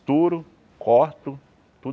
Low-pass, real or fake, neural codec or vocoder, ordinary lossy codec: none; real; none; none